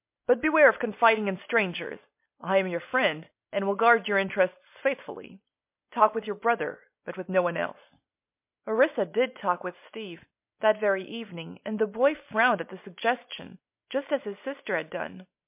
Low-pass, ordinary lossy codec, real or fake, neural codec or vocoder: 3.6 kHz; MP3, 32 kbps; real; none